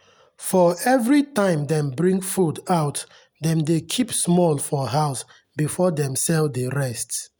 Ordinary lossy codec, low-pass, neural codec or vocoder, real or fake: none; none; none; real